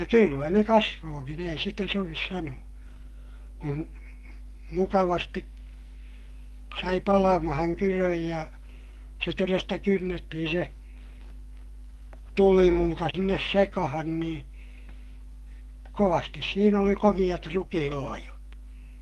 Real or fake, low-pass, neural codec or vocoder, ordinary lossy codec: fake; 14.4 kHz; codec, 44.1 kHz, 2.6 kbps, SNAC; Opus, 32 kbps